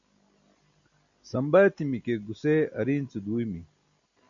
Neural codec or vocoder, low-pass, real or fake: none; 7.2 kHz; real